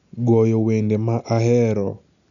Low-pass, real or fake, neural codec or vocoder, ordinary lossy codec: 7.2 kHz; real; none; none